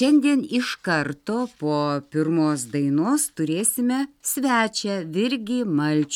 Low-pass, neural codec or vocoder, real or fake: 19.8 kHz; none; real